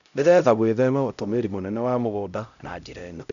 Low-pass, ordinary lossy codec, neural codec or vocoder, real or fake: 7.2 kHz; none; codec, 16 kHz, 0.5 kbps, X-Codec, HuBERT features, trained on LibriSpeech; fake